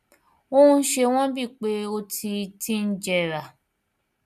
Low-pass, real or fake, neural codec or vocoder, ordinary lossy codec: 14.4 kHz; real; none; none